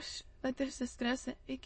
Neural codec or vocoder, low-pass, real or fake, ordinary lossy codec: autoencoder, 22.05 kHz, a latent of 192 numbers a frame, VITS, trained on many speakers; 9.9 kHz; fake; MP3, 32 kbps